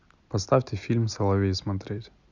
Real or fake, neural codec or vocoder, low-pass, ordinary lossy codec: real; none; 7.2 kHz; none